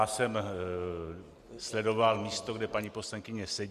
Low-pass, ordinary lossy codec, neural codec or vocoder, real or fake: 14.4 kHz; Opus, 64 kbps; none; real